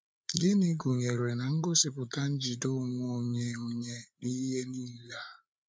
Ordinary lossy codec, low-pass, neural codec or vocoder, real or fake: none; none; codec, 16 kHz, 8 kbps, FreqCodec, larger model; fake